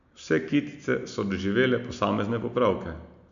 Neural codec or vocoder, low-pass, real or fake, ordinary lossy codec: none; 7.2 kHz; real; none